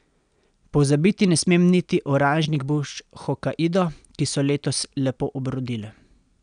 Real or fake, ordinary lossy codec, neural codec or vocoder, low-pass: real; none; none; 9.9 kHz